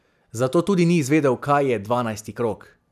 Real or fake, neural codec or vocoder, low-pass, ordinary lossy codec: real; none; 14.4 kHz; none